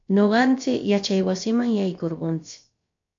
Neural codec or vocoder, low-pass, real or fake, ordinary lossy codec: codec, 16 kHz, about 1 kbps, DyCAST, with the encoder's durations; 7.2 kHz; fake; MP3, 48 kbps